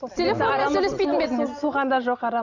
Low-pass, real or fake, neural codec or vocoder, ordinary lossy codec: 7.2 kHz; real; none; none